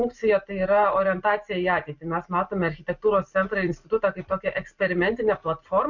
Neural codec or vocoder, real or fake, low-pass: none; real; 7.2 kHz